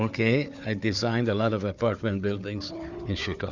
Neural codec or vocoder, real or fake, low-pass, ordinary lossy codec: codec, 16 kHz, 4 kbps, FunCodec, trained on Chinese and English, 50 frames a second; fake; 7.2 kHz; Opus, 64 kbps